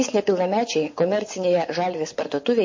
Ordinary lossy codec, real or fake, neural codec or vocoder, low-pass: MP3, 32 kbps; fake; vocoder, 24 kHz, 100 mel bands, Vocos; 7.2 kHz